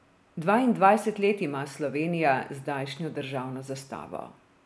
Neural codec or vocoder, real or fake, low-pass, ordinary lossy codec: none; real; none; none